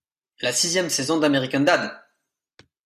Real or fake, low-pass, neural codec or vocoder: real; 14.4 kHz; none